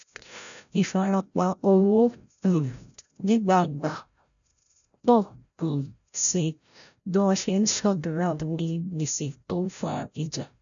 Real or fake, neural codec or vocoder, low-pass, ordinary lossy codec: fake; codec, 16 kHz, 0.5 kbps, FreqCodec, larger model; 7.2 kHz; none